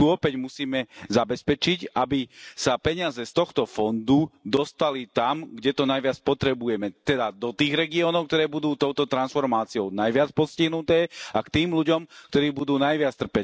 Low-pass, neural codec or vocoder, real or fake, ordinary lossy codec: none; none; real; none